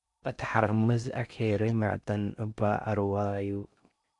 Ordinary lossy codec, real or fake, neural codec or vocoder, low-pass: MP3, 96 kbps; fake; codec, 16 kHz in and 24 kHz out, 0.6 kbps, FocalCodec, streaming, 2048 codes; 10.8 kHz